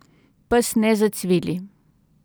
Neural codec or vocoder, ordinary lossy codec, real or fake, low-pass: none; none; real; none